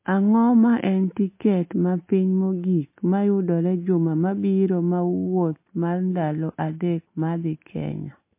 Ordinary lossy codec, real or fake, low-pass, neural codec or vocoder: MP3, 24 kbps; real; 3.6 kHz; none